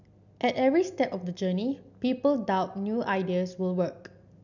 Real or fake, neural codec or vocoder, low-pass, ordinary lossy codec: real; none; 7.2 kHz; none